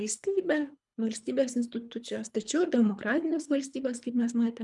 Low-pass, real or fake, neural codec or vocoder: 10.8 kHz; fake; codec, 24 kHz, 3 kbps, HILCodec